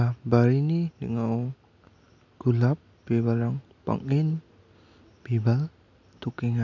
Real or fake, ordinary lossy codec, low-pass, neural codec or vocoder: real; none; 7.2 kHz; none